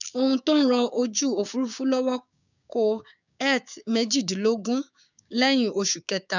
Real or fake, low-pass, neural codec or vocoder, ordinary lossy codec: fake; 7.2 kHz; codec, 16 kHz, 6 kbps, DAC; none